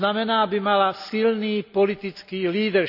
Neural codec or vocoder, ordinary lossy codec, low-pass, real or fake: none; none; 5.4 kHz; real